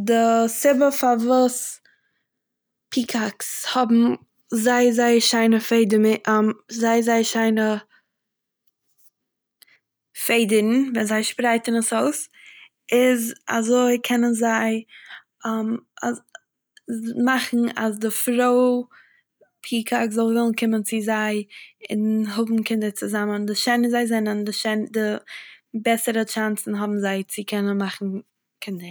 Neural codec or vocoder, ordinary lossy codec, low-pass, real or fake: none; none; none; real